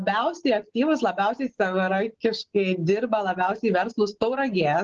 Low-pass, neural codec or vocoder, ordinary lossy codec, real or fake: 7.2 kHz; none; Opus, 16 kbps; real